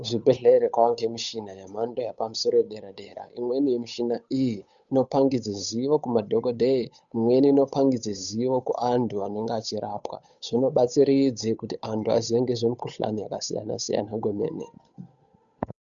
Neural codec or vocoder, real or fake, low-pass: codec, 16 kHz, 8 kbps, FunCodec, trained on Chinese and English, 25 frames a second; fake; 7.2 kHz